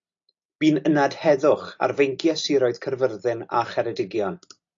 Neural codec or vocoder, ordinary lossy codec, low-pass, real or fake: none; AAC, 48 kbps; 7.2 kHz; real